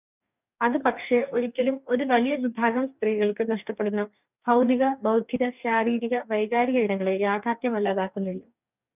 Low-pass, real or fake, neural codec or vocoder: 3.6 kHz; fake; codec, 44.1 kHz, 2.6 kbps, DAC